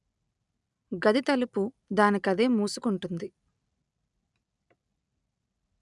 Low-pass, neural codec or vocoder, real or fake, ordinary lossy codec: 10.8 kHz; none; real; none